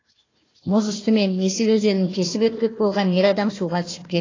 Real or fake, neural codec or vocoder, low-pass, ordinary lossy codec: fake; codec, 16 kHz, 1 kbps, FunCodec, trained on Chinese and English, 50 frames a second; 7.2 kHz; AAC, 32 kbps